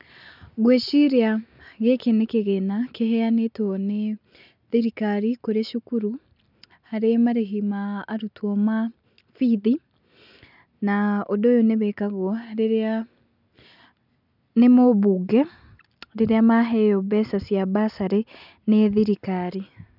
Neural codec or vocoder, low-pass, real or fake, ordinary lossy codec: none; 5.4 kHz; real; none